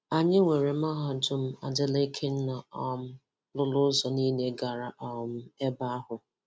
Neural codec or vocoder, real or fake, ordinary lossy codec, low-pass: none; real; none; none